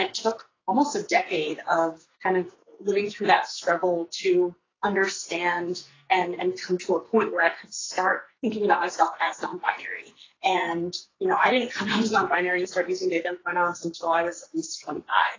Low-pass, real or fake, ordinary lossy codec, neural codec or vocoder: 7.2 kHz; fake; AAC, 32 kbps; codec, 44.1 kHz, 2.6 kbps, SNAC